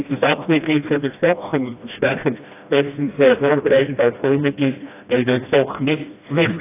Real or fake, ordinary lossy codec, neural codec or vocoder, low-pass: fake; AAC, 24 kbps; codec, 16 kHz, 1 kbps, FreqCodec, smaller model; 3.6 kHz